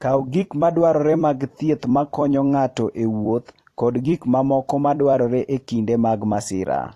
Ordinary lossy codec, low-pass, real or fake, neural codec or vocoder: AAC, 48 kbps; 14.4 kHz; fake; vocoder, 44.1 kHz, 128 mel bands every 256 samples, BigVGAN v2